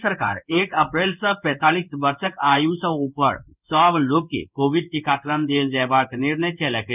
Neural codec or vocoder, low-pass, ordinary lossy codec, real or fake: codec, 16 kHz in and 24 kHz out, 1 kbps, XY-Tokenizer; 3.6 kHz; none; fake